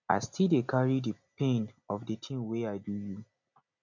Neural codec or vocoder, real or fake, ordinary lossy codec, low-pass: none; real; none; 7.2 kHz